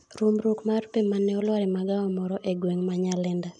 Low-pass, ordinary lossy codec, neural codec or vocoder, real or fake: 10.8 kHz; none; none; real